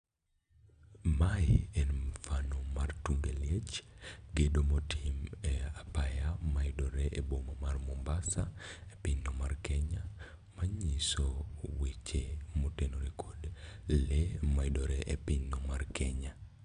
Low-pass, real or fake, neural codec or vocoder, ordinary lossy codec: 9.9 kHz; real; none; none